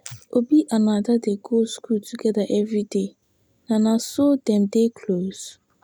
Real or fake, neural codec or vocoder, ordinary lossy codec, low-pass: real; none; none; none